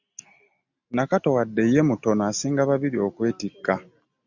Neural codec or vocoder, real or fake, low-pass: none; real; 7.2 kHz